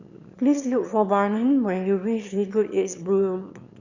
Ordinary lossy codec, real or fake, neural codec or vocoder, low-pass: Opus, 64 kbps; fake; autoencoder, 22.05 kHz, a latent of 192 numbers a frame, VITS, trained on one speaker; 7.2 kHz